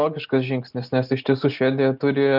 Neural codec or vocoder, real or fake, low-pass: none; real; 5.4 kHz